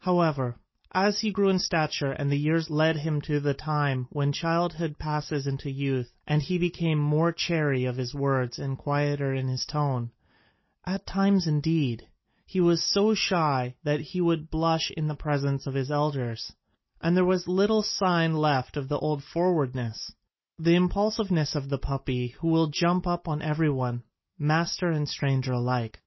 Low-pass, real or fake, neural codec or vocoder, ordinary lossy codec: 7.2 kHz; real; none; MP3, 24 kbps